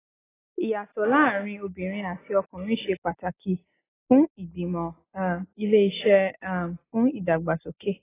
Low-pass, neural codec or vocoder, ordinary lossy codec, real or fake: 3.6 kHz; none; AAC, 16 kbps; real